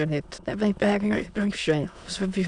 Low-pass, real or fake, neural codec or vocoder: 9.9 kHz; fake; autoencoder, 22.05 kHz, a latent of 192 numbers a frame, VITS, trained on many speakers